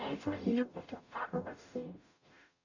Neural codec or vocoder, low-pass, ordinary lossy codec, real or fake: codec, 44.1 kHz, 0.9 kbps, DAC; 7.2 kHz; none; fake